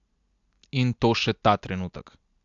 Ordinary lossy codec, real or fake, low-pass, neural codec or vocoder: AAC, 64 kbps; real; 7.2 kHz; none